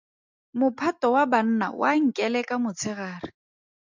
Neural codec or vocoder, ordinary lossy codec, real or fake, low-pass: none; MP3, 64 kbps; real; 7.2 kHz